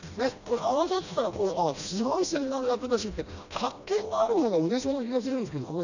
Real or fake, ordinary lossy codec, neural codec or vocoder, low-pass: fake; none; codec, 16 kHz, 1 kbps, FreqCodec, smaller model; 7.2 kHz